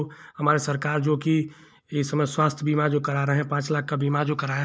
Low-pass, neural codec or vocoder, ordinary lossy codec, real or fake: none; none; none; real